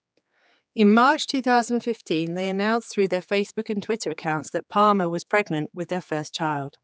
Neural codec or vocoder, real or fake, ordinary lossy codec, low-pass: codec, 16 kHz, 4 kbps, X-Codec, HuBERT features, trained on general audio; fake; none; none